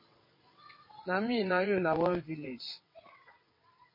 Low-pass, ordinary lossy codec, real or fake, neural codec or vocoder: 5.4 kHz; MP3, 24 kbps; fake; vocoder, 22.05 kHz, 80 mel bands, WaveNeXt